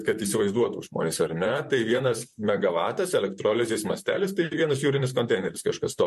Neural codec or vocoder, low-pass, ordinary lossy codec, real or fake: vocoder, 44.1 kHz, 128 mel bands, Pupu-Vocoder; 14.4 kHz; MP3, 64 kbps; fake